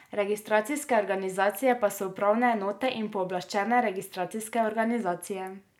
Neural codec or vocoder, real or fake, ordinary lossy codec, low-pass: none; real; none; 19.8 kHz